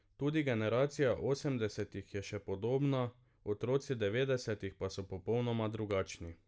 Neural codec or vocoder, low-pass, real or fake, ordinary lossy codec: none; none; real; none